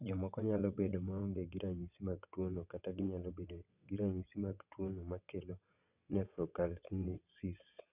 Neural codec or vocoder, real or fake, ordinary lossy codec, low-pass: vocoder, 22.05 kHz, 80 mel bands, WaveNeXt; fake; none; 3.6 kHz